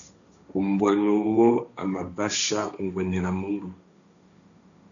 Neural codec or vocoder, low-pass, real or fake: codec, 16 kHz, 1.1 kbps, Voila-Tokenizer; 7.2 kHz; fake